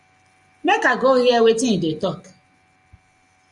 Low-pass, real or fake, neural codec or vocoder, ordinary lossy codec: 10.8 kHz; real; none; Opus, 64 kbps